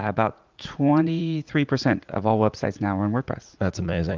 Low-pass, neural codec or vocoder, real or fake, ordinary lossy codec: 7.2 kHz; vocoder, 44.1 kHz, 80 mel bands, Vocos; fake; Opus, 32 kbps